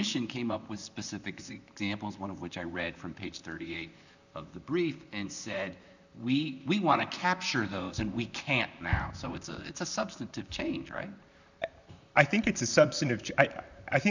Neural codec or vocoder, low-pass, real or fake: vocoder, 44.1 kHz, 128 mel bands, Pupu-Vocoder; 7.2 kHz; fake